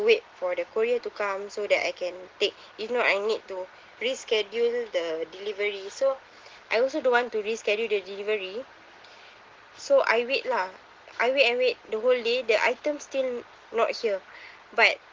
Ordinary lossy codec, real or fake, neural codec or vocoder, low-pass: Opus, 16 kbps; real; none; 7.2 kHz